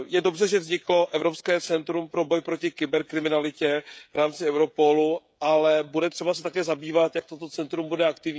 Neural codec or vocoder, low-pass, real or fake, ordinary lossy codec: codec, 16 kHz, 16 kbps, FreqCodec, smaller model; none; fake; none